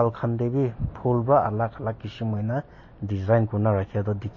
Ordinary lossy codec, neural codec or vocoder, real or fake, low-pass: MP3, 32 kbps; none; real; 7.2 kHz